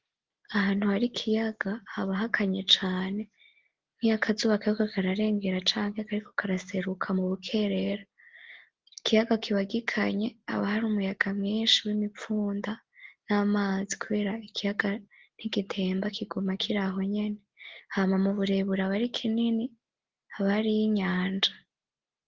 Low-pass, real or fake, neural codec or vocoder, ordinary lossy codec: 7.2 kHz; real; none; Opus, 16 kbps